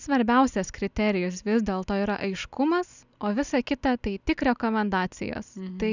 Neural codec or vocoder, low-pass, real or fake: none; 7.2 kHz; real